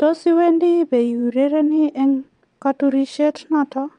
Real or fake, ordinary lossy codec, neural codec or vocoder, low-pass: fake; none; vocoder, 22.05 kHz, 80 mel bands, WaveNeXt; 9.9 kHz